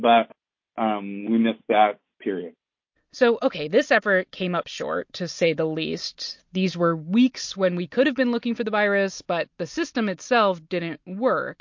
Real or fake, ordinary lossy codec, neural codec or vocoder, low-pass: real; MP3, 48 kbps; none; 7.2 kHz